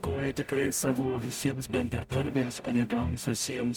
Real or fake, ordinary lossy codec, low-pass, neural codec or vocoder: fake; MP3, 96 kbps; 19.8 kHz; codec, 44.1 kHz, 0.9 kbps, DAC